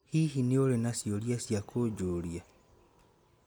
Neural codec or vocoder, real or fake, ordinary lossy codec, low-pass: none; real; none; none